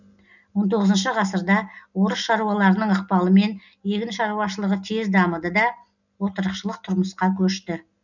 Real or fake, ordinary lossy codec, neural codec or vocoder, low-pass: real; none; none; 7.2 kHz